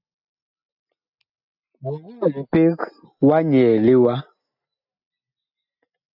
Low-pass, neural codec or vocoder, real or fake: 5.4 kHz; none; real